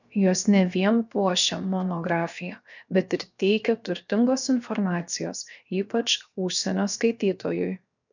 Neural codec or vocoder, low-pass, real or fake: codec, 16 kHz, 0.7 kbps, FocalCodec; 7.2 kHz; fake